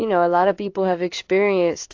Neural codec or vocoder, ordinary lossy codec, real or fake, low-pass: codec, 16 kHz in and 24 kHz out, 1 kbps, XY-Tokenizer; AAC, 48 kbps; fake; 7.2 kHz